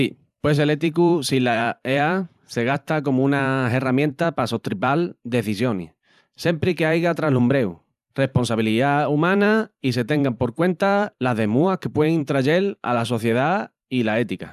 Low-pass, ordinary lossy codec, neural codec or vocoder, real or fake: 14.4 kHz; none; vocoder, 44.1 kHz, 128 mel bands every 512 samples, BigVGAN v2; fake